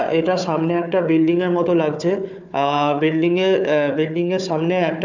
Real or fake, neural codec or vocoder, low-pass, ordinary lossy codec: fake; codec, 16 kHz, 4 kbps, FunCodec, trained on Chinese and English, 50 frames a second; 7.2 kHz; none